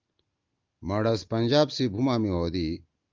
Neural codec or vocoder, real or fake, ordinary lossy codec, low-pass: none; real; Opus, 24 kbps; 7.2 kHz